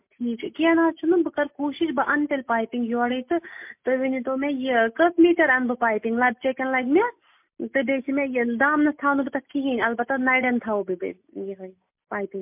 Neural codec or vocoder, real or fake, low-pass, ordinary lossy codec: none; real; 3.6 kHz; MP3, 32 kbps